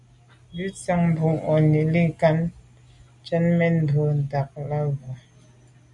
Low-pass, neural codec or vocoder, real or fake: 10.8 kHz; none; real